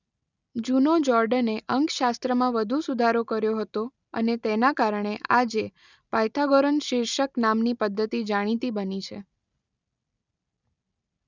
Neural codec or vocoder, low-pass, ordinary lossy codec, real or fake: none; 7.2 kHz; none; real